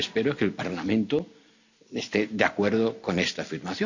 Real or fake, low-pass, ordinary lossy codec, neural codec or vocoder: real; 7.2 kHz; none; none